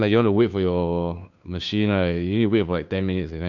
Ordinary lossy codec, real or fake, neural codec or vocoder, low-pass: none; fake; codec, 16 kHz, 2 kbps, FunCodec, trained on Chinese and English, 25 frames a second; 7.2 kHz